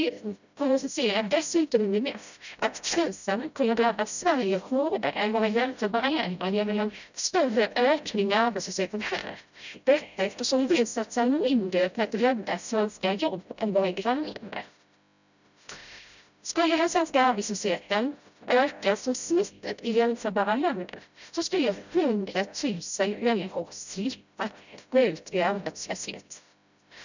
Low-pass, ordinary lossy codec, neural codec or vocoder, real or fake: 7.2 kHz; none; codec, 16 kHz, 0.5 kbps, FreqCodec, smaller model; fake